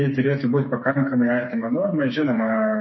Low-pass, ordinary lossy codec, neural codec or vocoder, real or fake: 7.2 kHz; MP3, 24 kbps; codec, 16 kHz, 4 kbps, FreqCodec, smaller model; fake